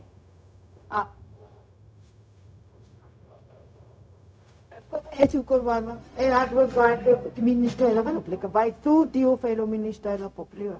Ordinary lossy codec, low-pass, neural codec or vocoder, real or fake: none; none; codec, 16 kHz, 0.4 kbps, LongCat-Audio-Codec; fake